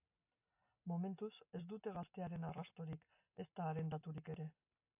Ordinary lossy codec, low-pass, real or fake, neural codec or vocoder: AAC, 24 kbps; 3.6 kHz; real; none